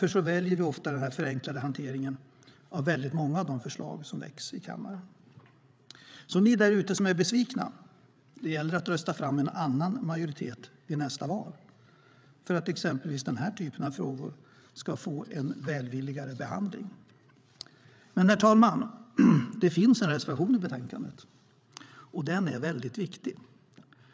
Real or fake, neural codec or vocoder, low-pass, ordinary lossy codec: fake; codec, 16 kHz, 8 kbps, FreqCodec, larger model; none; none